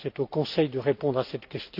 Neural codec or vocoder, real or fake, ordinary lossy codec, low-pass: none; real; none; 5.4 kHz